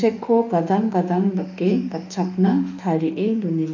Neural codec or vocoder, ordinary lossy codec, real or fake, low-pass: autoencoder, 48 kHz, 32 numbers a frame, DAC-VAE, trained on Japanese speech; none; fake; 7.2 kHz